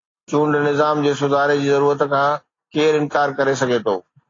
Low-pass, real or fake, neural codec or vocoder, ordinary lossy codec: 7.2 kHz; real; none; AAC, 32 kbps